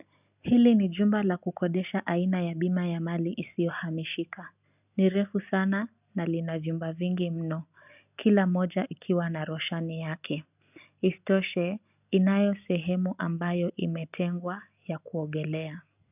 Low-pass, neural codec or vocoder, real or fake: 3.6 kHz; none; real